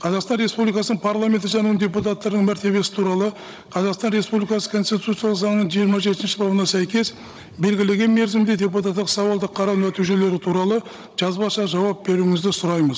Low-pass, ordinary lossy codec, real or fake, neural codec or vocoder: none; none; fake; codec, 16 kHz, 16 kbps, FunCodec, trained on Chinese and English, 50 frames a second